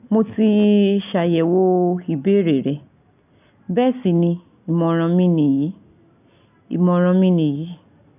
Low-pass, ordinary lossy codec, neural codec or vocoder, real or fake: 3.6 kHz; none; none; real